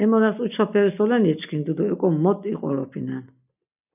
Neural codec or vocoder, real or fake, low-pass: none; real; 3.6 kHz